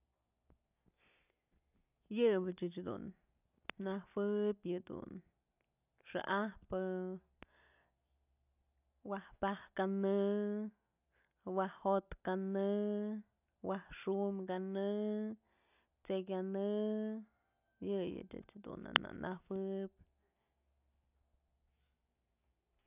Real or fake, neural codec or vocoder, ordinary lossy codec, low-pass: real; none; none; 3.6 kHz